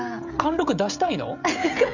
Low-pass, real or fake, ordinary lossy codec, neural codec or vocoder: 7.2 kHz; fake; none; codec, 16 kHz, 16 kbps, FreqCodec, smaller model